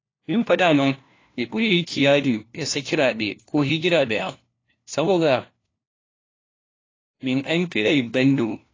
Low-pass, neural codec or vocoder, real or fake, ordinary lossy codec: 7.2 kHz; codec, 16 kHz, 1 kbps, FunCodec, trained on LibriTTS, 50 frames a second; fake; AAC, 32 kbps